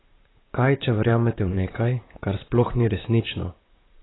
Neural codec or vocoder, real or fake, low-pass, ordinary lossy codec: vocoder, 44.1 kHz, 128 mel bands, Pupu-Vocoder; fake; 7.2 kHz; AAC, 16 kbps